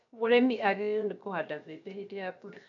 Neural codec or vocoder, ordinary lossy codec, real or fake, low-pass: codec, 16 kHz, 0.7 kbps, FocalCodec; none; fake; 7.2 kHz